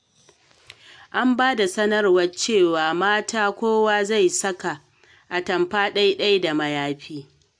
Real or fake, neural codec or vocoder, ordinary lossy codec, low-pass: real; none; AAC, 64 kbps; 9.9 kHz